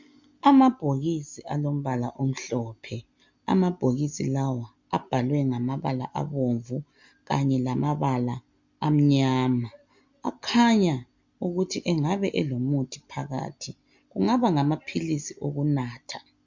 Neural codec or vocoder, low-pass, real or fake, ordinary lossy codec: none; 7.2 kHz; real; MP3, 64 kbps